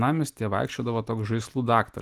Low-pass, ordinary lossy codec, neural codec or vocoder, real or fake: 14.4 kHz; Opus, 24 kbps; none; real